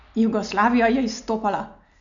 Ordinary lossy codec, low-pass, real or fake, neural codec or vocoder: none; 7.2 kHz; real; none